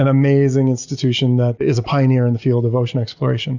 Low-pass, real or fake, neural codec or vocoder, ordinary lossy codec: 7.2 kHz; real; none; Opus, 64 kbps